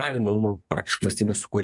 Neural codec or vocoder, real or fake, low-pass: codec, 24 kHz, 1 kbps, SNAC; fake; 10.8 kHz